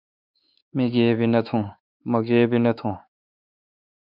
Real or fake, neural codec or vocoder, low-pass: fake; codec, 16 kHz, 4 kbps, X-Codec, WavLM features, trained on Multilingual LibriSpeech; 5.4 kHz